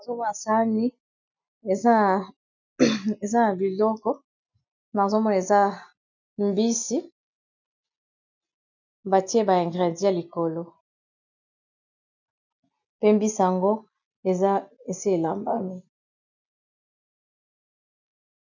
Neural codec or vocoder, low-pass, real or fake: none; 7.2 kHz; real